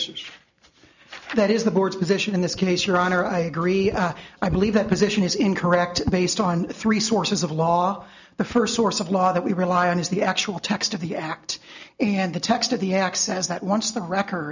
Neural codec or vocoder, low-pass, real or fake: none; 7.2 kHz; real